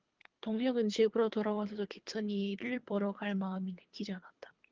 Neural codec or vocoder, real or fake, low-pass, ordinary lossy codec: codec, 24 kHz, 3 kbps, HILCodec; fake; 7.2 kHz; Opus, 32 kbps